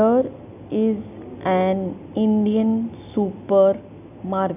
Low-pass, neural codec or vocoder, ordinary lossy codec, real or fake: 3.6 kHz; none; none; real